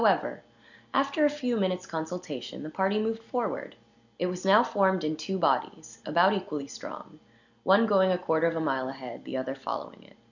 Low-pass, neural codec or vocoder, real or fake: 7.2 kHz; none; real